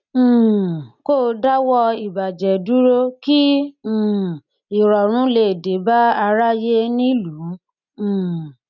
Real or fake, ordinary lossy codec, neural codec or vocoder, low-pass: real; none; none; 7.2 kHz